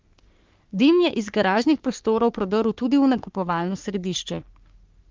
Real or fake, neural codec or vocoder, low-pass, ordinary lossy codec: fake; codec, 44.1 kHz, 3.4 kbps, Pupu-Codec; 7.2 kHz; Opus, 32 kbps